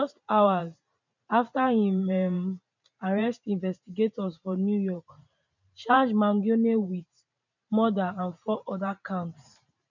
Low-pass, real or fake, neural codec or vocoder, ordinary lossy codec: 7.2 kHz; fake; vocoder, 44.1 kHz, 128 mel bands every 512 samples, BigVGAN v2; none